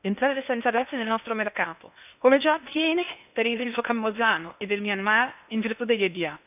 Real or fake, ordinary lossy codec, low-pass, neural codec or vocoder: fake; none; 3.6 kHz; codec, 16 kHz in and 24 kHz out, 0.6 kbps, FocalCodec, streaming, 2048 codes